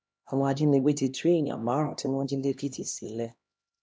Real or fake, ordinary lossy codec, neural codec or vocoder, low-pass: fake; none; codec, 16 kHz, 1 kbps, X-Codec, HuBERT features, trained on LibriSpeech; none